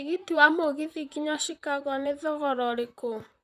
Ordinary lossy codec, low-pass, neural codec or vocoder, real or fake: none; 14.4 kHz; vocoder, 44.1 kHz, 128 mel bands, Pupu-Vocoder; fake